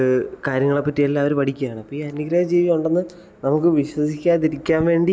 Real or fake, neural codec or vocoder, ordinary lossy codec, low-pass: real; none; none; none